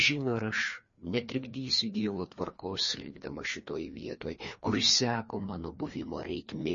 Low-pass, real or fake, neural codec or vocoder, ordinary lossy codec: 7.2 kHz; fake; codec, 16 kHz, 2 kbps, FreqCodec, larger model; MP3, 32 kbps